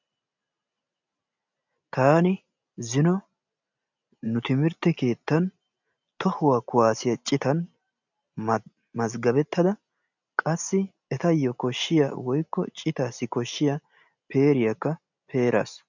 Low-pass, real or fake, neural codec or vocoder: 7.2 kHz; real; none